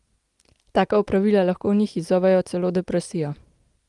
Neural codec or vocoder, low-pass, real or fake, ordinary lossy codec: none; 10.8 kHz; real; Opus, 24 kbps